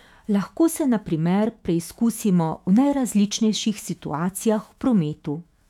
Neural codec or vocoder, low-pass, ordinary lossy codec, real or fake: autoencoder, 48 kHz, 128 numbers a frame, DAC-VAE, trained on Japanese speech; 19.8 kHz; none; fake